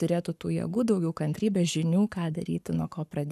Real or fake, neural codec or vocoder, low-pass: fake; codec, 44.1 kHz, 7.8 kbps, Pupu-Codec; 14.4 kHz